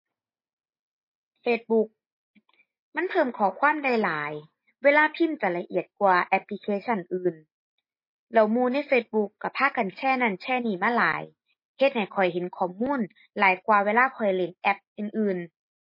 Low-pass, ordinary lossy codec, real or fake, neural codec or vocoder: 5.4 kHz; MP3, 24 kbps; real; none